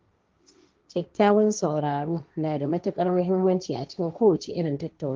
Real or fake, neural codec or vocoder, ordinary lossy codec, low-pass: fake; codec, 16 kHz, 1.1 kbps, Voila-Tokenizer; Opus, 24 kbps; 7.2 kHz